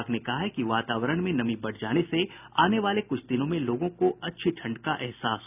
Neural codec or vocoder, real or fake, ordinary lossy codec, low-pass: none; real; none; 3.6 kHz